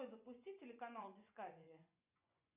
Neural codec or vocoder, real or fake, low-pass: vocoder, 24 kHz, 100 mel bands, Vocos; fake; 3.6 kHz